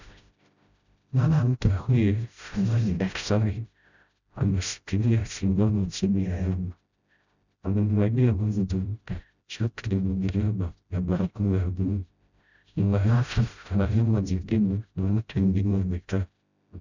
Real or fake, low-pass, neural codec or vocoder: fake; 7.2 kHz; codec, 16 kHz, 0.5 kbps, FreqCodec, smaller model